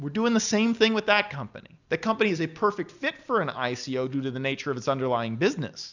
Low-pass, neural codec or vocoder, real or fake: 7.2 kHz; none; real